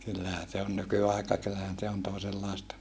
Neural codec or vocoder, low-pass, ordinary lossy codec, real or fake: none; none; none; real